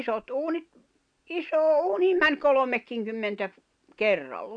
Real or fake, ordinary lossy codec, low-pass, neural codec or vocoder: real; none; 9.9 kHz; none